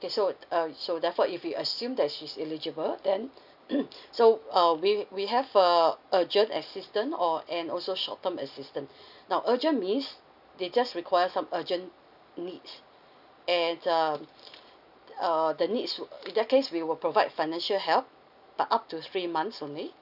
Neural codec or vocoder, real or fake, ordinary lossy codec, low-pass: none; real; none; 5.4 kHz